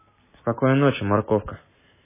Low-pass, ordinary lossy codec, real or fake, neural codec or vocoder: 3.6 kHz; MP3, 16 kbps; real; none